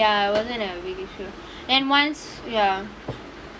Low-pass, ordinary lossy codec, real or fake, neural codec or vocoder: none; none; real; none